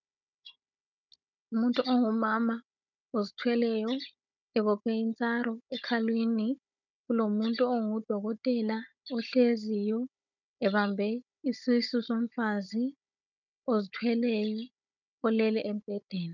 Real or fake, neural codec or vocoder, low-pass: fake; codec, 16 kHz, 16 kbps, FunCodec, trained on Chinese and English, 50 frames a second; 7.2 kHz